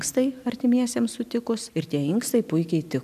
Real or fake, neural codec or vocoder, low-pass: real; none; 14.4 kHz